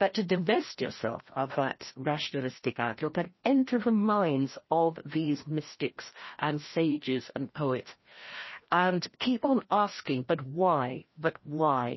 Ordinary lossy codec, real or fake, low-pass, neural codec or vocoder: MP3, 24 kbps; fake; 7.2 kHz; codec, 16 kHz, 1 kbps, FreqCodec, larger model